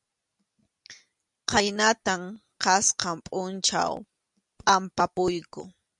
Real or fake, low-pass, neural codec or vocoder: real; 10.8 kHz; none